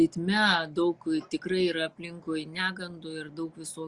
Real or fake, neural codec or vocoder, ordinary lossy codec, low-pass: real; none; Opus, 64 kbps; 10.8 kHz